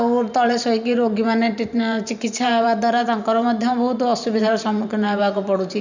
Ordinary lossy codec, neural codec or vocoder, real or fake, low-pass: none; vocoder, 44.1 kHz, 128 mel bands every 512 samples, BigVGAN v2; fake; 7.2 kHz